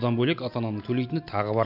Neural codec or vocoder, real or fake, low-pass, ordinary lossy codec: none; real; 5.4 kHz; none